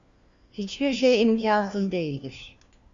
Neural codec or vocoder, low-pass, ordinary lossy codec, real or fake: codec, 16 kHz, 1 kbps, FunCodec, trained on LibriTTS, 50 frames a second; 7.2 kHz; Opus, 64 kbps; fake